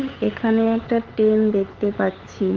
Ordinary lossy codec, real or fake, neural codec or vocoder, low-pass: Opus, 16 kbps; fake; codec, 16 kHz, 8 kbps, FunCodec, trained on LibriTTS, 25 frames a second; 7.2 kHz